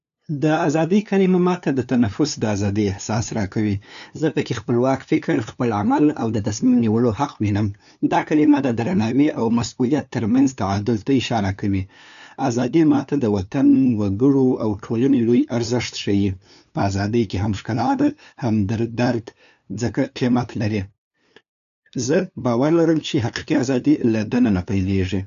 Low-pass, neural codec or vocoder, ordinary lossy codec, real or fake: 7.2 kHz; codec, 16 kHz, 2 kbps, FunCodec, trained on LibriTTS, 25 frames a second; none; fake